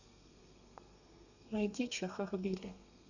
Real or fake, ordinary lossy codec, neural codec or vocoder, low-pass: fake; none; codec, 32 kHz, 1.9 kbps, SNAC; 7.2 kHz